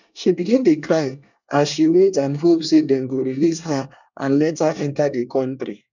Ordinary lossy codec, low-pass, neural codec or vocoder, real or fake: none; 7.2 kHz; codec, 24 kHz, 1 kbps, SNAC; fake